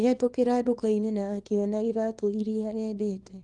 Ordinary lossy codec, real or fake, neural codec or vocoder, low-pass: Opus, 32 kbps; fake; codec, 24 kHz, 0.9 kbps, WavTokenizer, small release; 10.8 kHz